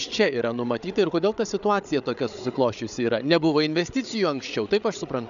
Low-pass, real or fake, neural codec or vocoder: 7.2 kHz; fake; codec, 16 kHz, 8 kbps, FreqCodec, larger model